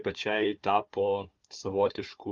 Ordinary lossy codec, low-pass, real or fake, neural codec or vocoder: Opus, 32 kbps; 7.2 kHz; fake; codec, 16 kHz, 4 kbps, FunCodec, trained on Chinese and English, 50 frames a second